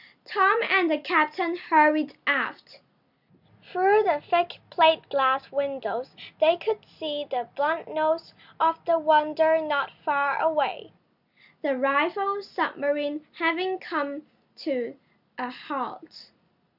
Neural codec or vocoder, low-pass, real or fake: none; 5.4 kHz; real